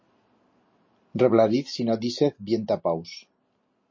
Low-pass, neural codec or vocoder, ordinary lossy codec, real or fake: 7.2 kHz; none; MP3, 32 kbps; real